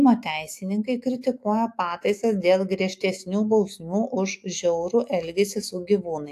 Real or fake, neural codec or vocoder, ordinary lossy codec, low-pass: fake; autoencoder, 48 kHz, 128 numbers a frame, DAC-VAE, trained on Japanese speech; AAC, 64 kbps; 14.4 kHz